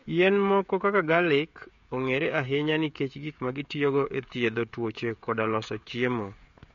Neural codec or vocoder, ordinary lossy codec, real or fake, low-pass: codec, 16 kHz, 16 kbps, FreqCodec, smaller model; MP3, 48 kbps; fake; 7.2 kHz